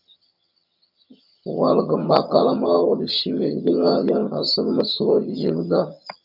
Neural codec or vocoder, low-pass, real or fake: vocoder, 22.05 kHz, 80 mel bands, HiFi-GAN; 5.4 kHz; fake